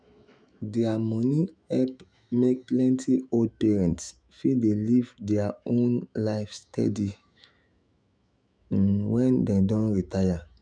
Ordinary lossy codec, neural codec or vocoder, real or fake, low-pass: none; autoencoder, 48 kHz, 128 numbers a frame, DAC-VAE, trained on Japanese speech; fake; 9.9 kHz